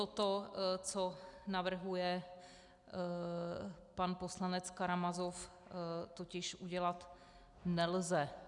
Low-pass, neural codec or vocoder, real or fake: 10.8 kHz; none; real